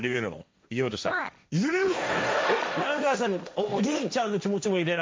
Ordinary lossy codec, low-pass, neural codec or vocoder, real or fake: none; none; codec, 16 kHz, 1.1 kbps, Voila-Tokenizer; fake